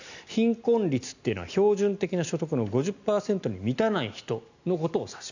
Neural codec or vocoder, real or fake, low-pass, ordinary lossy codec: none; real; 7.2 kHz; none